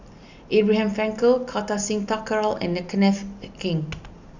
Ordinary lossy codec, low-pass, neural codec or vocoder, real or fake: none; 7.2 kHz; none; real